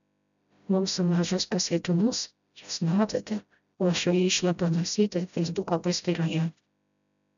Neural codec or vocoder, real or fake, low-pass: codec, 16 kHz, 0.5 kbps, FreqCodec, smaller model; fake; 7.2 kHz